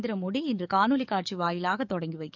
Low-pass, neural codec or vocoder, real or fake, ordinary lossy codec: 7.2 kHz; codec, 44.1 kHz, 7.8 kbps, DAC; fake; none